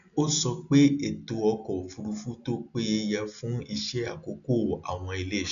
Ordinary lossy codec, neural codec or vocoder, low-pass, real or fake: none; none; 7.2 kHz; real